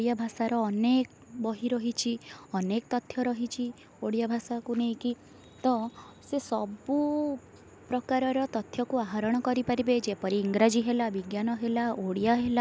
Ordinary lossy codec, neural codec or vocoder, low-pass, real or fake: none; none; none; real